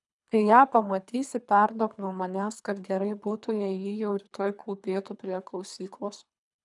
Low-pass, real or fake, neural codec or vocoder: 10.8 kHz; fake; codec, 24 kHz, 3 kbps, HILCodec